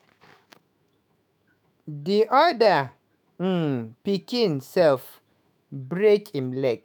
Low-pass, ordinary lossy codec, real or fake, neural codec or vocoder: none; none; fake; autoencoder, 48 kHz, 128 numbers a frame, DAC-VAE, trained on Japanese speech